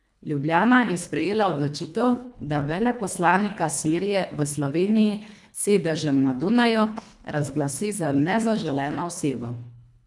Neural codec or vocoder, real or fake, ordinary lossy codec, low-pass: codec, 24 kHz, 1.5 kbps, HILCodec; fake; none; none